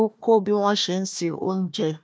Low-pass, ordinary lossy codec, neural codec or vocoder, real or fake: none; none; codec, 16 kHz, 1 kbps, FunCodec, trained on Chinese and English, 50 frames a second; fake